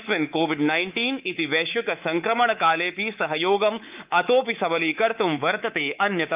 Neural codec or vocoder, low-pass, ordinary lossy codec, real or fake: codec, 24 kHz, 3.1 kbps, DualCodec; 3.6 kHz; Opus, 24 kbps; fake